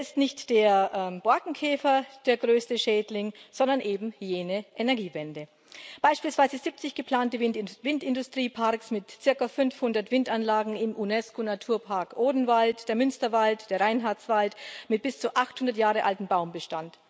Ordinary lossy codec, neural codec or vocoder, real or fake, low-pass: none; none; real; none